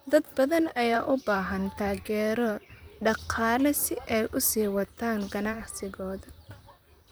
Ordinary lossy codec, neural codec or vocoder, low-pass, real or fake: none; vocoder, 44.1 kHz, 128 mel bands, Pupu-Vocoder; none; fake